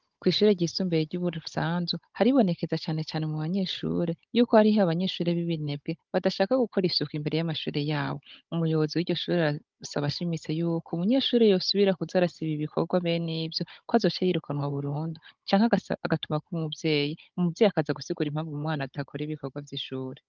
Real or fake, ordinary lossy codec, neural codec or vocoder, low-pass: fake; Opus, 24 kbps; codec, 16 kHz, 16 kbps, FunCodec, trained on Chinese and English, 50 frames a second; 7.2 kHz